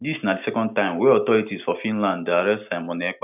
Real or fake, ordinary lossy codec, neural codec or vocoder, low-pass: real; none; none; 3.6 kHz